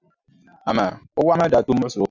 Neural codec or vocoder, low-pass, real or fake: none; 7.2 kHz; real